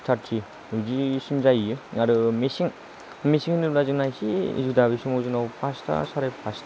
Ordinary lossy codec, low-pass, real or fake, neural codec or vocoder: none; none; real; none